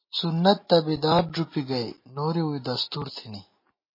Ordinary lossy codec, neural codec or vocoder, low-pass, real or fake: MP3, 24 kbps; none; 5.4 kHz; real